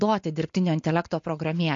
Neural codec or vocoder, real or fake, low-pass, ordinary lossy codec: none; real; 7.2 kHz; MP3, 48 kbps